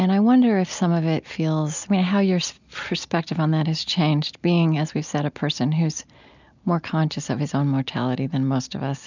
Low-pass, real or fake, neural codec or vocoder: 7.2 kHz; real; none